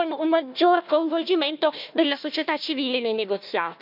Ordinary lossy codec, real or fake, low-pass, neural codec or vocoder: none; fake; 5.4 kHz; codec, 16 kHz, 1 kbps, FunCodec, trained on Chinese and English, 50 frames a second